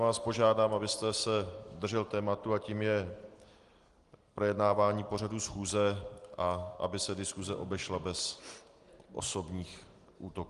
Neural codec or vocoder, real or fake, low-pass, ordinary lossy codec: none; real; 10.8 kHz; Opus, 24 kbps